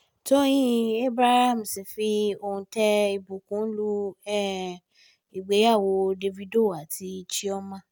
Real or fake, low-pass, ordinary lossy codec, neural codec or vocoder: real; none; none; none